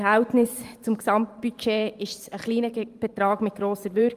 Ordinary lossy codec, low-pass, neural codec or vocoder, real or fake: Opus, 32 kbps; 14.4 kHz; vocoder, 44.1 kHz, 128 mel bands every 512 samples, BigVGAN v2; fake